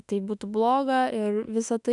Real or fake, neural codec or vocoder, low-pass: fake; autoencoder, 48 kHz, 32 numbers a frame, DAC-VAE, trained on Japanese speech; 10.8 kHz